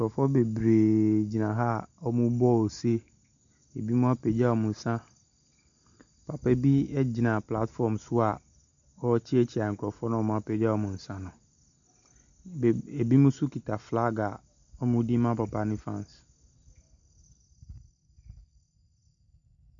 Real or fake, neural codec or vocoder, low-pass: real; none; 7.2 kHz